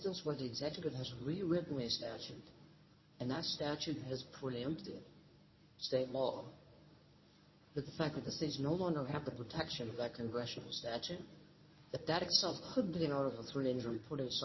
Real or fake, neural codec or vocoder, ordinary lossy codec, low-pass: fake; codec, 24 kHz, 0.9 kbps, WavTokenizer, medium speech release version 1; MP3, 24 kbps; 7.2 kHz